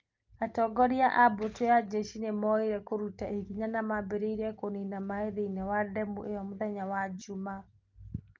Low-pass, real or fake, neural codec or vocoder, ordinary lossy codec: 7.2 kHz; real; none; Opus, 32 kbps